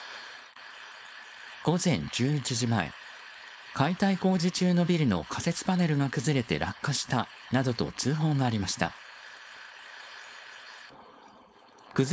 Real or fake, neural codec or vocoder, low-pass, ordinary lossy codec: fake; codec, 16 kHz, 4.8 kbps, FACodec; none; none